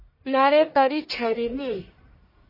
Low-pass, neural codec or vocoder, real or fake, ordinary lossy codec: 5.4 kHz; codec, 44.1 kHz, 1.7 kbps, Pupu-Codec; fake; MP3, 24 kbps